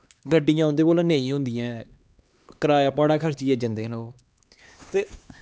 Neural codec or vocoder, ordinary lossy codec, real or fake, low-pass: codec, 16 kHz, 2 kbps, X-Codec, HuBERT features, trained on LibriSpeech; none; fake; none